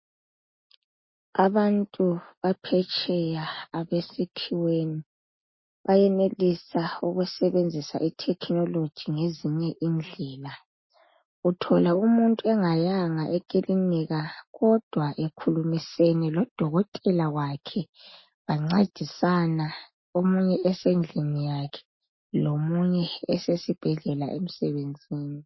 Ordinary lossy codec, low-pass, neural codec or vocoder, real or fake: MP3, 24 kbps; 7.2 kHz; none; real